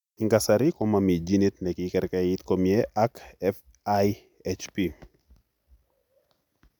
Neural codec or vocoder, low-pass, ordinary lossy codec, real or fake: none; 19.8 kHz; none; real